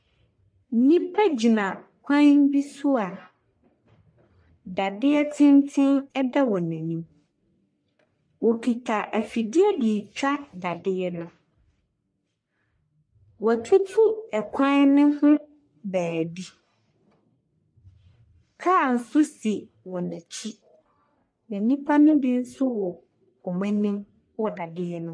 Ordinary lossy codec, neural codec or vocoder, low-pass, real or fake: MP3, 48 kbps; codec, 44.1 kHz, 1.7 kbps, Pupu-Codec; 9.9 kHz; fake